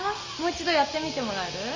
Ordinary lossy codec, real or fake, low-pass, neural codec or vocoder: Opus, 32 kbps; real; 7.2 kHz; none